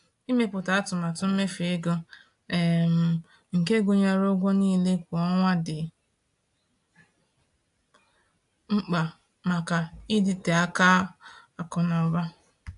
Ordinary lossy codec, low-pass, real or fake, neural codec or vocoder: AAC, 64 kbps; 10.8 kHz; real; none